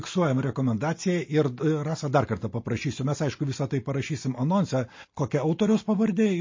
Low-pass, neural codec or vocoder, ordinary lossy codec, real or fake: 7.2 kHz; none; MP3, 32 kbps; real